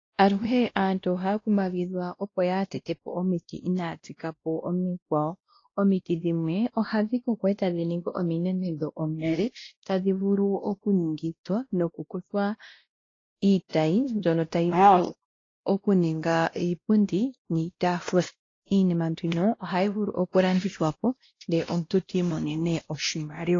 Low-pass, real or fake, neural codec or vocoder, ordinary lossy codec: 7.2 kHz; fake; codec, 16 kHz, 1 kbps, X-Codec, WavLM features, trained on Multilingual LibriSpeech; AAC, 32 kbps